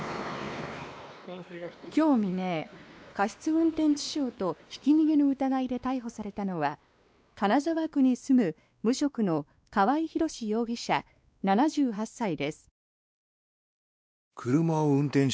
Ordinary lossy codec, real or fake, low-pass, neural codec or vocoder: none; fake; none; codec, 16 kHz, 2 kbps, X-Codec, WavLM features, trained on Multilingual LibriSpeech